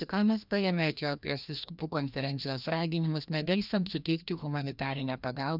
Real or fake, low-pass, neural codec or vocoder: fake; 5.4 kHz; codec, 16 kHz, 1 kbps, FreqCodec, larger model